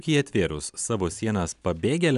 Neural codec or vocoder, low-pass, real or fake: none; 10.8 kHz; real